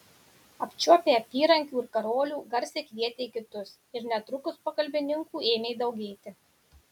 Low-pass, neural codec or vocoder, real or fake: 19.8 kHz; none; real